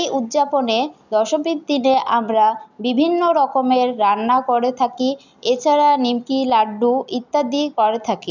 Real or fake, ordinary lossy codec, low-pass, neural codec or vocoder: real; none; 7.2 kHz; none